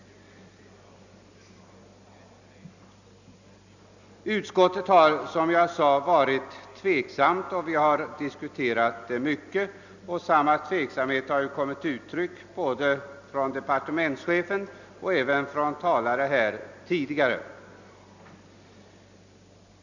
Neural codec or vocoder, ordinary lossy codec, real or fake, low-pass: none; none; real; 7.2 kHz